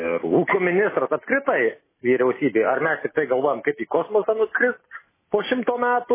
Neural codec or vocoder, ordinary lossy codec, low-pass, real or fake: none; MP3, 16 kbps; 3.6 kHz; real